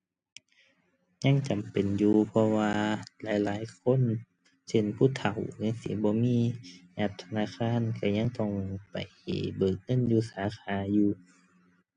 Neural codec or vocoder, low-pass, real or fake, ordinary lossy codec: none; 9.9 kHz; real; none